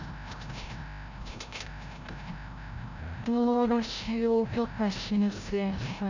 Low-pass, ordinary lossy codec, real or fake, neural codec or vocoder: 7.2 kHz; none; fake; codec, 16 kHz, 0.5 kbps, FreqCodec, larger model